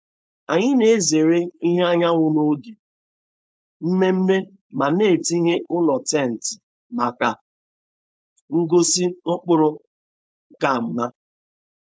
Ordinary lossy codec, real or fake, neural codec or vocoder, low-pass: none; fake; codec, 16 kHz, 4.8 kbps, FACodec; none